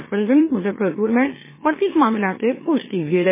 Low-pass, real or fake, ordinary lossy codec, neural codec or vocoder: 3.6 kHz; fake; MP3, 16 kbps; autoencoder, 44.1 kHz, a latent of 192 numbers a frame, MeloTTS